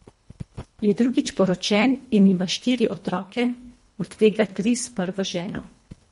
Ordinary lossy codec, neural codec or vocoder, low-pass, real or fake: MP3, 48 kbps; codec, 24 kHz, 1.5 kbps, HILCodec; 10.8 kHz; fake